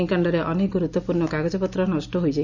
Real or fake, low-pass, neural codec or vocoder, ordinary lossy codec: real; 7.2 kHz; none; none